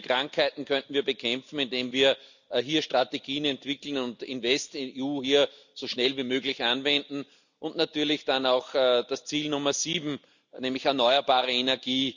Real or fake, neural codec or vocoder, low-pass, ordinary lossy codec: real; none; 7.2 kHz; none